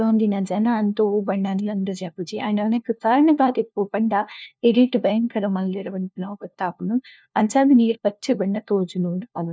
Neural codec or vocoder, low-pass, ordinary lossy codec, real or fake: codec, 16 kHz, 1 kbps, FunCodec, trained on LibriTTS, 50 frames a second; none; none; fake